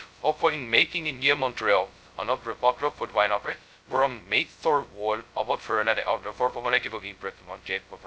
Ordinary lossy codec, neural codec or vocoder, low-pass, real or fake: none; codec, 16 kHz, 0.2 kbps, FocalCodec; none; fake